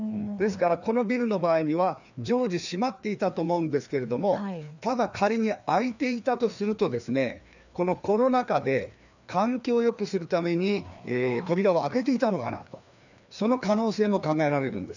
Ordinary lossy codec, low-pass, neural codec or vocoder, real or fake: none; 7.2 kHz; codec, 16 kHz, 2 kbps, FreqCodec, larger model; fake